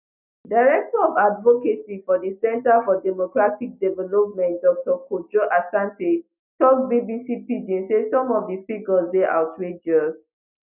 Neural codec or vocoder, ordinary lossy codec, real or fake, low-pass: none; none; real; 3.6 kHz